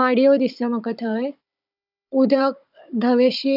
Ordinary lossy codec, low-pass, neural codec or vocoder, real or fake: none; 5.4 kHz; codec, 16 kHz, 16 kbps, FunCodec, trained on Chinese and English, 50 frames a second; fake